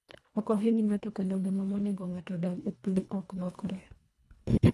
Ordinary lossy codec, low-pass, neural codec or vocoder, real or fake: none; none; codec, 24 kHz, 1.5 kbps, HILCodec; fake